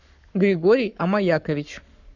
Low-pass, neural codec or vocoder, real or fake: 7.2 kHz; codec, 44.1 kHz, 7.8 kbps, Pupu-Codec; fake